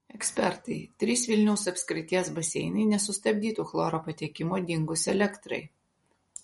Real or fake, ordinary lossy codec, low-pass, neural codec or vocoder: real; MP3, 48 kbps; 19.8 kHz; none